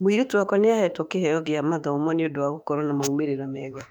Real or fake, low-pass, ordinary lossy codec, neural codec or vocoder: fake; 19.8 kHz; none; autoencoder, 48 kHz, 32 numbers a frame, DAC-VAE, trained on Japanese speech